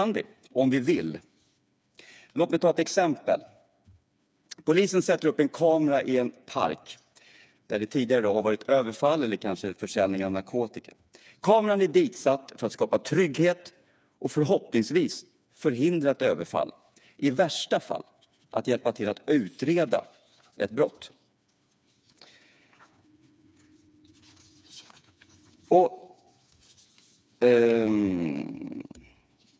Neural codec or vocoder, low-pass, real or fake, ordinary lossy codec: codec, 16 kHz, 4 kbps, FreqCodec, smaller model; none; fake; none